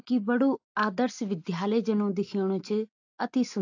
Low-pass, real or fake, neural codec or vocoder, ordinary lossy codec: 7.2 kHz; real; none; AAC, 48 kbps